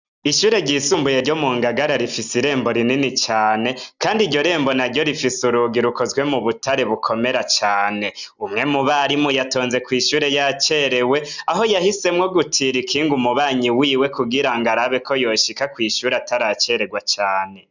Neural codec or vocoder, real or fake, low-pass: none; real; 7.2 kHz